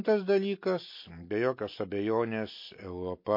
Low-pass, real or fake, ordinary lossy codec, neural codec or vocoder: 5.4 kHz; real; MP3, 32 kbps; none